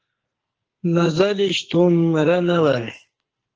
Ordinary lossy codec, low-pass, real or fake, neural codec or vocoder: Opus, 16 kbps; 7.2 kHz; fake; codec, 44.1 kHz, 2.6 kbps, SNAC